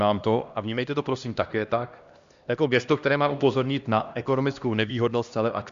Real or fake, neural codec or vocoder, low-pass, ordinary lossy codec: fake; codec, 16 kHz, 1 kbps, X-Codec, HuBERT features, trained on LibriSpeech; 7.2 kHz; Opus, 64 kbps